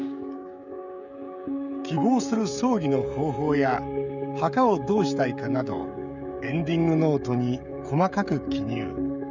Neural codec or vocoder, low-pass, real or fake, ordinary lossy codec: codec, 16 kHz, 16 kbps, FreqCodec, smaller model; 7.2 kHz; fake; none